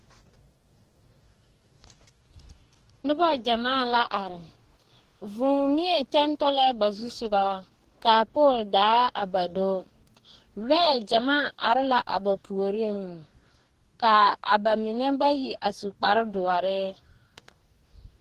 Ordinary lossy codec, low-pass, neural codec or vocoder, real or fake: Opus, 16 kbps; 14.4 kHz; codec, 44.1 kHz, 2.6 kbps, DAC; fake